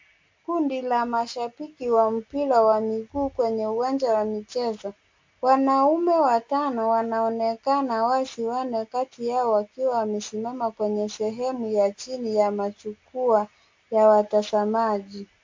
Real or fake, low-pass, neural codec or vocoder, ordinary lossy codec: real; 7.2 kHz; none; MP3, 48 kbps